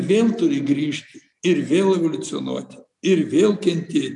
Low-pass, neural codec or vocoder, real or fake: 10.8 kHz; none; real